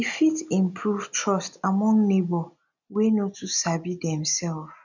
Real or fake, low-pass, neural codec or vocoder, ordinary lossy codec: real; 7.2 kHz; none; none